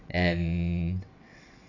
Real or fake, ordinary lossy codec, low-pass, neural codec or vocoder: fake; none; 7.2 kHz; vocoder, 22.05 kHz, 80 mel bands, WaveNeXt